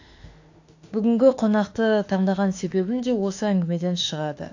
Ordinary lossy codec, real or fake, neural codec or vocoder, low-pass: AAC, 48 kbps; fake; autoencoder, 48 kHz, 32 numbers a frame, DAC-VAE, trained on Japanese speech; 7.2 kHz